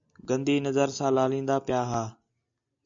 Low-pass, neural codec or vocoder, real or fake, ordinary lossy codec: 7.2 kHz; none; real; AAC, 64 kbps